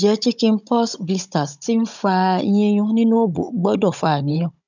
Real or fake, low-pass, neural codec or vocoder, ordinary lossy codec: fake; 7.2 kHz; codec, 16 kHz, 16 kbps, FunCodec, trained on Chinese and English, 50 frames a second; none